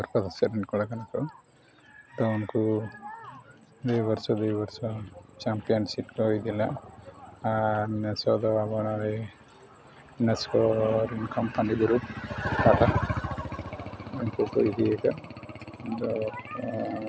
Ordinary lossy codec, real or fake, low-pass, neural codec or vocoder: none; real; none; none